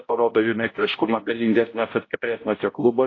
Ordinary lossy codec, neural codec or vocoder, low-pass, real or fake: AAC, 32 kbps; codec, 16 kHz, 0.5 kbps, X-Codec, HuBERT features, trained on balanced general audio; 7.2 kHz; fake